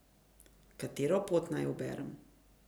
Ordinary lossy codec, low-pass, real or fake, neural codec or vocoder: none; none; real; none